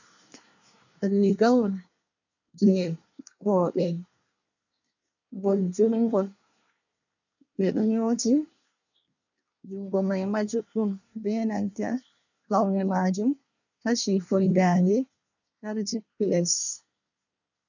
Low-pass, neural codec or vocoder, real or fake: 7.2 kHz; codec, 24 kHz, 1 kbps, SNAC; fake